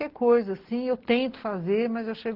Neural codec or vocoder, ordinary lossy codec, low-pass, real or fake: none; Opus, 16 kbps; 5.4 kHz; real